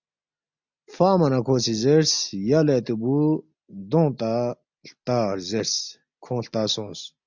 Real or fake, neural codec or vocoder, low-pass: real; none; 7.2 kHz